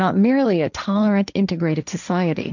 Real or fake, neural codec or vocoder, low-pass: fake; codec, 16 kHz, 1.1 kbps, Voila-Tokenizer; 7.2 kHz